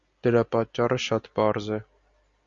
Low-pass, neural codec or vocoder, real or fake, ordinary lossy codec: 7.2 kHz; none; real; Opus, 64 kbps